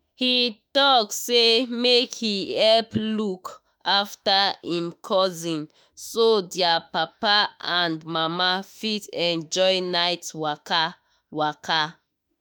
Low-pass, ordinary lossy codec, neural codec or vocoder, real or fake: none; none; autoencoder, 48 kHz, 32 numbers a frame, DAC-VAE, trained on Japanese speech; fake